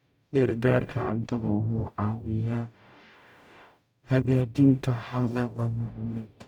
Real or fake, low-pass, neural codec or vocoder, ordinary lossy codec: fake; 19.8 kHz; codec, 44.1 kHz, 0.9 kbps, DAC; none